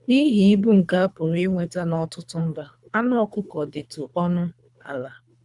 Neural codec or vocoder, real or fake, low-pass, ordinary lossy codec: codec, 24 kHz, 3 kbps, HILCodec; fake; 10.8 kHz; none